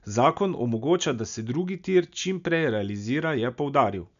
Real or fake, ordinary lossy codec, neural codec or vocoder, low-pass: real; none; none; 7.2 kHz